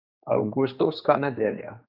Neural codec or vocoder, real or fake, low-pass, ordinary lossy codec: codec, 16 kHz, 2 kbps, X-Codec, HuBERT features, trained on general audio; fake; 5.4 kHz; none